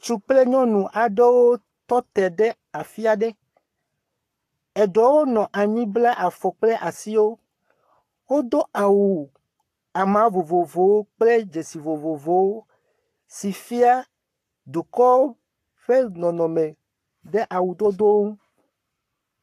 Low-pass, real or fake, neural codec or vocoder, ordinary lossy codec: 14.4 kHz; fake; codec, 44.1 kHz, 7.8 kbps, Pupu-Codec; AAC, 64 kbps